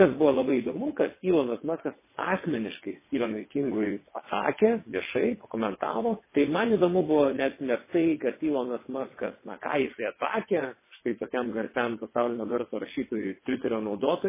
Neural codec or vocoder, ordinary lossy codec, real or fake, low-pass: vocoder, 22.05 kHz, 80 mel bands, WaveNeXt; MP3, 16 kbps; fake; 3.6 kHz